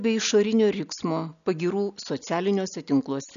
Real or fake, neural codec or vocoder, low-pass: real; none; 7.2 kHz